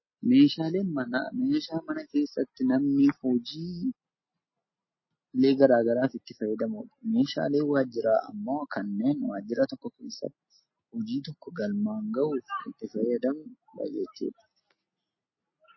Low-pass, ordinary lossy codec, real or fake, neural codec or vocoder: 7.2 kHz; MP3, 24 kbps; real; none